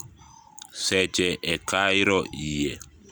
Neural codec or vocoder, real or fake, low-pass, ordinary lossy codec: none; real; none; none